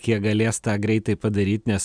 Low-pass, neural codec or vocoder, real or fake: 9.9 kHz; none; real